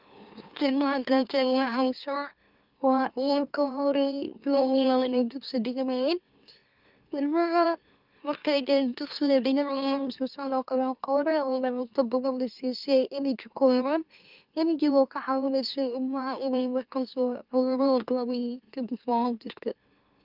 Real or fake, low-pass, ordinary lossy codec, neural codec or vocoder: fake; 5.4 kHz; Opus, 32 kbps; autoencoder, 44.1 kHz, a latent of 192 numbers a frame, MeloTTS